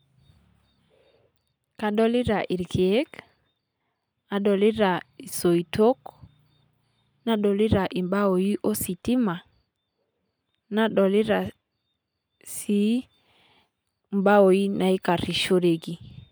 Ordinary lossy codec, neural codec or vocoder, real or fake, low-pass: none; none; real; none